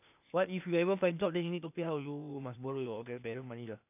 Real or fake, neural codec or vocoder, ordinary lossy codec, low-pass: fake; codec, 16 kHz, 0.8 kbps, ZipCodec; none; 3.6 kHz